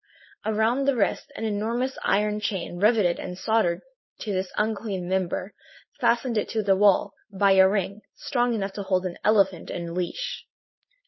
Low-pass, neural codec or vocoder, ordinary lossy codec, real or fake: 7.2 kHz; codec, 16 kHz, 4.8 kbps, FACodec; MP3, 24 kbps; fake